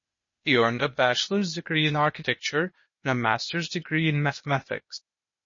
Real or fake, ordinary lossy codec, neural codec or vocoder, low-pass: fake; MP3, 32 kbps; codec, 16 kHz, 0.8 kbps, ZipCodec; 7.2 kHz